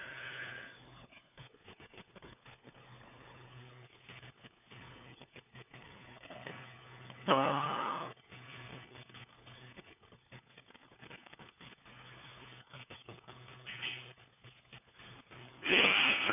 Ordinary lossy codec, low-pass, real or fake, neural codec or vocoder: none; 3.6 kHz; fake; codec, 16 kHz, 4 kbps, FunCodec, trained on LibriTTS, 50 frames a second